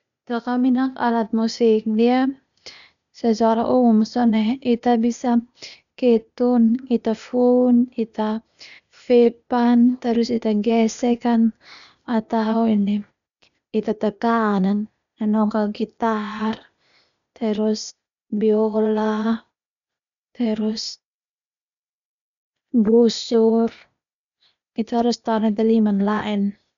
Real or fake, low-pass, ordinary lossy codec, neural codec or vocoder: fake; 7.2 kHz; none; codec, 16 kHz, 0.8 kbps, ZipCodec